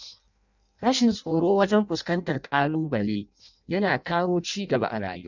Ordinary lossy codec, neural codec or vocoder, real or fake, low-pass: none; codec, 16 kHz in and 24 kHz out, 0.6 kbps, FireRedTTS-2 codec; fake; 7.2 kHz